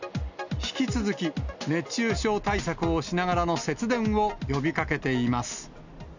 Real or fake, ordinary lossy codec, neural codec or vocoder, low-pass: real; none; none; 7.2 kHz